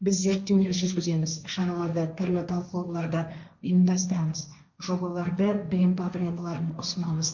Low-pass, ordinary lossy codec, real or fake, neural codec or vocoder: 7.2 kHz; none; fake; codec, 16 kHz, 1.1 kbps, Voila-Tokenizer